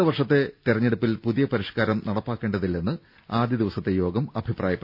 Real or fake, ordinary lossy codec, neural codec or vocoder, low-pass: real; none; none; 5.4 kHz